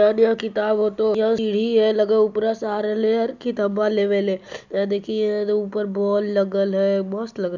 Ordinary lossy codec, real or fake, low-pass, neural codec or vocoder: none; real; 7.2 kHz; none